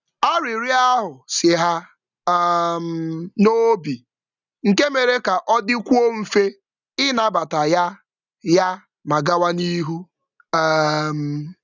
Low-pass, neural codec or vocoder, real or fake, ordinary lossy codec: 7.2 kHz; none; real; none